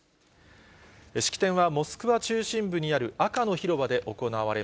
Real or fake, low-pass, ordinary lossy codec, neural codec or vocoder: real; none; none; none